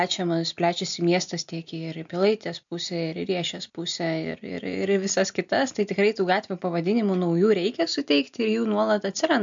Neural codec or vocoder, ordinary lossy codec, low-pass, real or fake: none; MP3, 64 kbps; 7.2 kHz; real